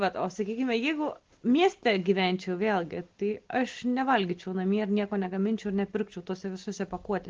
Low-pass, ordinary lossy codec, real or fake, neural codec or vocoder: 7.2 kHz; Opus, 16 kbps; real; none